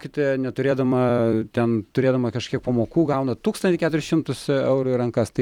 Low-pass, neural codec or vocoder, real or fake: 19.8 kHz; vocoder, 44.1 kHz, 128 mel bands every 256 samples, BigVGAN v2; fake